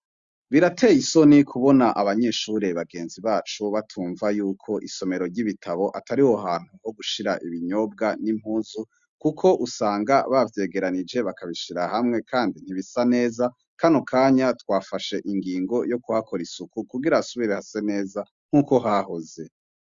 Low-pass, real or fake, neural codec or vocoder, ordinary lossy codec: 7.2 kHz; real; none; Opus, 64 kbps